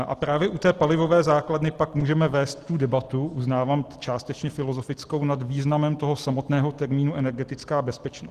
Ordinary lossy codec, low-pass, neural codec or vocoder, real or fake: Opus, 16 kbps; 14.4 kHz; none; real